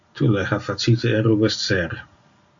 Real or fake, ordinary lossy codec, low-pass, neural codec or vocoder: real; AAC, 64 kbps; 7.2 kHz; none